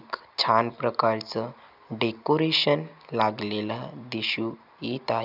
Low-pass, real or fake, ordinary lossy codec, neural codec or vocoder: 5.4 kHz; real; none; none